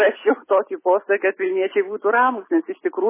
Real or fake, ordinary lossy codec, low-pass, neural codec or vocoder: real; MP3, 16 kbps; 3.6 kHz; none